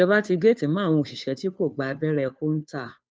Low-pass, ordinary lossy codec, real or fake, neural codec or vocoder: none; none; fake; codec, 16 kHz, 2 kbps, FunCodec, trained on Chinese and English, 25 frames a second